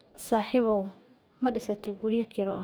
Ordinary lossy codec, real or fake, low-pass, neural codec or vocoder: none; fake; none; codec, 44.1 kHz, 2.6 kbps, DAC